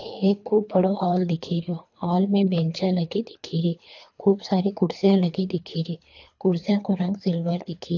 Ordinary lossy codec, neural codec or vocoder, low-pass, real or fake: AAC, 48 kbps; codec, 24 kHz, 3 kbps, HILCodec; 7.2 kHz; fake